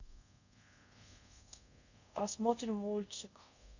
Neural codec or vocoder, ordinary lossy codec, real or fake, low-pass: codec, 24 kHz, 0.5 kbps, DualCodec; none; fake; 7.2 kHz